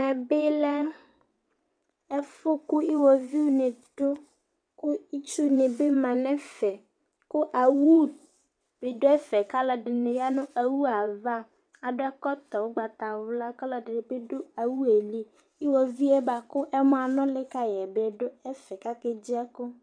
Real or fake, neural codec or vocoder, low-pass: fake; vocoder, 22.05 kHz, 80 mel bands, WaveNeXt; 9.9 kHz